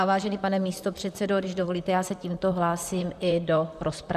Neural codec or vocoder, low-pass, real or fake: vocoder, 44.1 kHz, 128 mel bands, Pupu-Vocoder; 14.4 kHz; fake